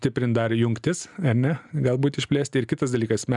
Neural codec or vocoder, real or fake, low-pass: none; real; 10.8 kHz